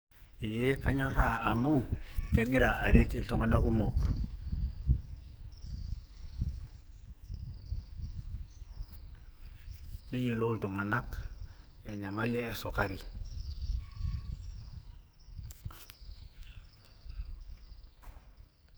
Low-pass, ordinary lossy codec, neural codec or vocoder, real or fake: none; none; codec, 44.1 kHz, 2.6 kbps, SNAC; fake